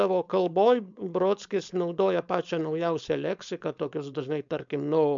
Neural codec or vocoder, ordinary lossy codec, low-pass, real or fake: codec, 16 kHz, 4.8 kbps, FACodec; MP3, 64 kbps; 7.2 kHz; fake